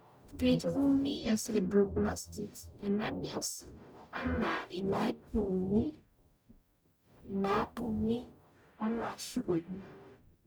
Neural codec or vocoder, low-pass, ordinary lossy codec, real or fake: codec, 44.1 kHz, 0.9 kbps, DAC; none; none; fake